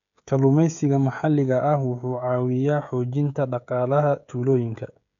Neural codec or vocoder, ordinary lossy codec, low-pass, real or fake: codec, 16 kHz, 16 kbps, FreqCodec, smaller model; none; 7.2 kHz; fake